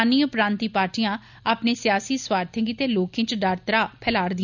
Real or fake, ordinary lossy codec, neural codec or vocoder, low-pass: real; none; none; 7.2 kHz